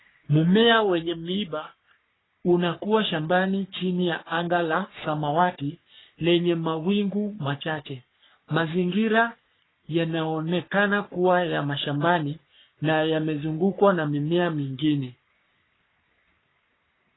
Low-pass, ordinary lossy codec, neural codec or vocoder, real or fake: 7.2 kHz; AAC, 16 kbps; codec, 44.1 kHz, 3.4 kbps, Pupu-Codec; fake